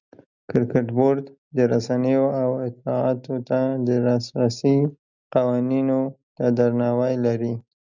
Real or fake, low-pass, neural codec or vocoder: real; 7.2 kHz; none